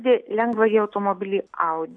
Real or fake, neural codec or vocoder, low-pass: real; none; 9.9 kHz